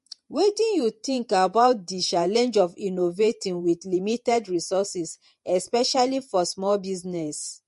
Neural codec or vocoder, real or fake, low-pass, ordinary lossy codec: vocoder, 48 kHz, 128 mel bands, Vocos; fake; 14.4 kHz; MP3, 48 kbps